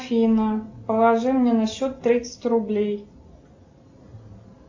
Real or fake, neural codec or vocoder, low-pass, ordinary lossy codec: real; none; 7.2 kHz; AAC, 48 kbps